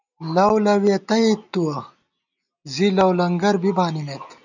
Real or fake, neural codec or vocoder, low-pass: real; none; 7.2 kHz